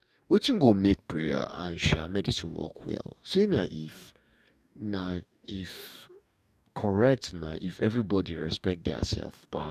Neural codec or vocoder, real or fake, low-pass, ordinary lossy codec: codec, 44.1 kHz, 2.6 kbps, DAC; fake; 14.4 kHz; none